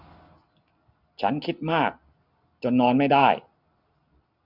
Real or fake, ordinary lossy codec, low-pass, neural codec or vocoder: real; none; 5.4 kHz; none